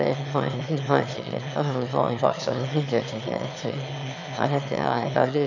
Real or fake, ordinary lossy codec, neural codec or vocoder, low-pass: fake; none; autoencoder, 22.05 kHz, a latent of 192 numbers a frame, VITS, trained on one speaker; 7.2 kHz